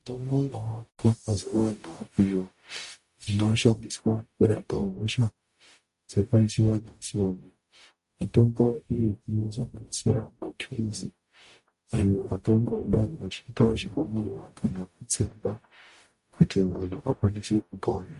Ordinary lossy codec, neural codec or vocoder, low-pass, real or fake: MP3, 48 kbps; codec, 44.1 kHz, 0.9 kbps, DAC; 14.4 kHz; fake